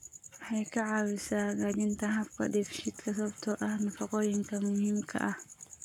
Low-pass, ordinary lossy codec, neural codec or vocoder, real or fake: 19.8 kHz; none; codec, 44.1 kHz, 7.8 kbps, Pupu-Codec; fake